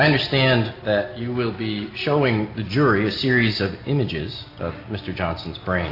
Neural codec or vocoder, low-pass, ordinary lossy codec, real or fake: none; 5.4 kHz; AAC, 32 kbps; real